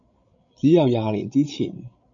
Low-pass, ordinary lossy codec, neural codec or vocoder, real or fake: 7.2 kHz; MP3, 96 kbps; codec, 16 kHz, 16 kbps, FreqCodec, larger model; fake